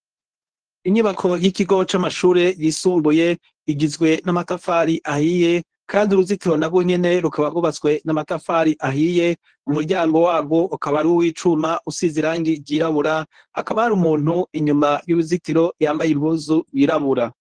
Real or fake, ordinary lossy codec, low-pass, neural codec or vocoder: fake; Opus, 16 kbps; 9.9 kHz; codec, 24 kHz, 0.9 kbps, WavTokenizer, medium speech release version 1